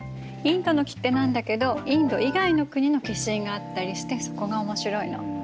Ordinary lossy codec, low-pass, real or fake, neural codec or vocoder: none; none; real; none